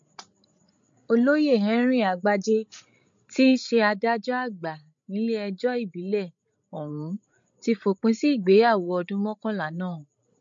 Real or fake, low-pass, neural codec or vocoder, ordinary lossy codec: fake; 7.2 kHz; codec, 16 kHz, 16 kbps, FreqCodec, larger model; MP3, 48 kbps